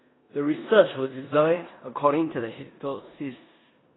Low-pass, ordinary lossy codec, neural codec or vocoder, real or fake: 7.2 kHz; AAC, 16 kbps; codec, 16 kHz in and 24 kHz out, 0.9 kbps, LongCat-Audio-Codec, four codebook decoder; fake